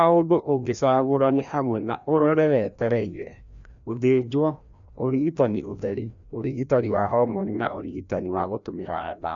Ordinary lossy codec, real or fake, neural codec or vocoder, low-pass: none; fake; codec, 16 kHz, 1 kbps, FreqCodec, larger model; 7.2 kHz